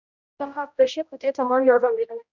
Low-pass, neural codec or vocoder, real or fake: 7.2 kHz; codec, 16 kHz, 0.5 kbps, X-Codec, HuBERT features, trained on general audio; fake